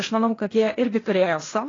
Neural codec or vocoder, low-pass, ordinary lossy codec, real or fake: codec, 16 kHz, 0.8 kbps, ZipCodec; 7.2 kHz; AAC, 32 kbps; fake